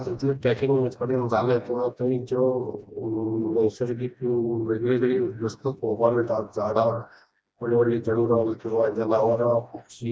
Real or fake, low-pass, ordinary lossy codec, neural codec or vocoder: fake; none; none; codec, 16 kHz, 1 kbps, FreqCodec, smaller model